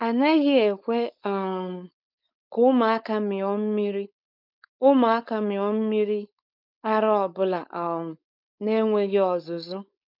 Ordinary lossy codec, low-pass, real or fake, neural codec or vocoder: none; 5.4 kHz; fake; codec, 16 kHz, 4.8 kbps, FACodec